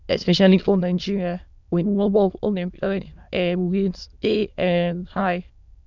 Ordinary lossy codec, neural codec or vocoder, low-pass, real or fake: none; autoencoder, 22.05 kHz, a latent of 192 numbers a frame, VITS, trained on many speakers; 7.2 kHz; fake